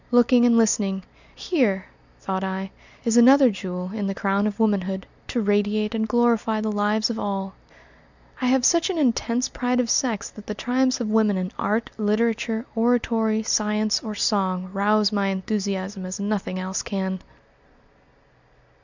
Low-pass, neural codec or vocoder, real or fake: 7.2 kHz; none; real